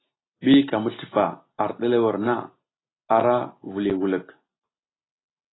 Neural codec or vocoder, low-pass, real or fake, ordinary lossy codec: none; 7.2 kHz; real; AAC, 16 kbps